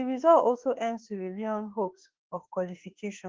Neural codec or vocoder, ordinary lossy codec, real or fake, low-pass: autoencoder, 48 kHz, 32 numbers a frame, DAC-VAE, trained on Japanese speech; Opus, 16 kbps; fake; 7.2 kHz